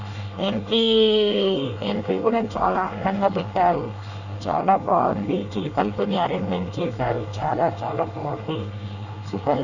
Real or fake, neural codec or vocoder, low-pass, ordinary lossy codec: fake; codec, 24 kHz, 1 kbps, SNAC; 7.2 kHz; none